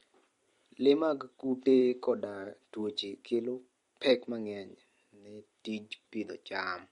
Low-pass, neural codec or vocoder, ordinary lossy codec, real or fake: 19.8 kHz; vocoder, 44.1 kHz, 128 mel bands every 256 samples, BigVGAN v2; MP3, 48 kbps; fake